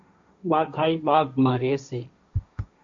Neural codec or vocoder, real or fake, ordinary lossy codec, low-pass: codec, 16 kHz, 1.1 kbps, Voila-Tokenizer; fake; MP3, 48 kbps; 7.2 kHz